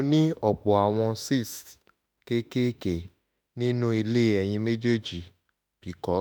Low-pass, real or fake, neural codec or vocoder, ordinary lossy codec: none; fake; autoencoder, 48 kHz, 32 numbers a frame, DAC-VAE, trained on Japanese speech; none